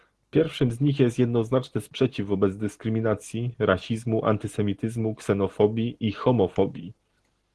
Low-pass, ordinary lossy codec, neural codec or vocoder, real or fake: 10.8 kHz; Opus, 16 kbps; none; real